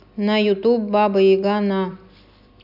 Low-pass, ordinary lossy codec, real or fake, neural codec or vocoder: 5.4 kHz; AAC, 48 kbps; real; none